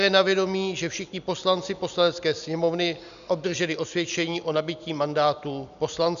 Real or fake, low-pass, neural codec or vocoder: real; 7.2 kHz; none